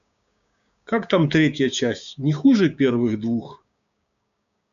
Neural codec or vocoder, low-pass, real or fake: codec, 16 kHz, 6 kbps, DAC; 7.2 kHz; fake